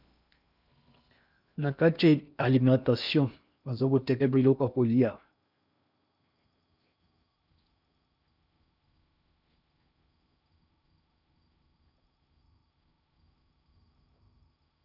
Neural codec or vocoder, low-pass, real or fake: codec, 16 kHz in and 24 kHz out, 0.8 kbps, FocalCodec, streaming, 65536 codes; 5.4 kHz; fake